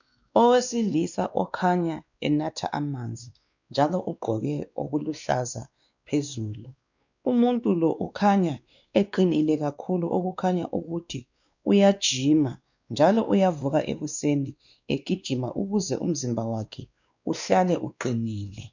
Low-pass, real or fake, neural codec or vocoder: 7.2 kHz; fake; codec, 16 kHz, 2 kbps, X-Codec, WavLM features, trained on Multilingual LibriSpeech